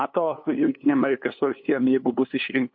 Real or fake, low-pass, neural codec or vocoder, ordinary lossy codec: fake; 7.2 kHz; codec, 16 kHz, 2 kbps, FunCodec, trained on LibriTTS, 25 frames a second; MP3, 32 kbps